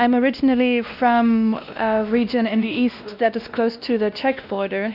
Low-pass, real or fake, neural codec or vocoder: 5.4 kHz; fake; codec, 16 kHz, 1 kbps, X-Codec, WavLM features, trained on Multilingual LibriSpeech